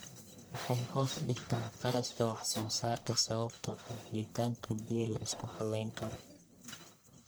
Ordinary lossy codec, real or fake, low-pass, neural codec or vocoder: none; fake; none; codec, 44.1 kHz, 1.7 kbps, Pupu-Codec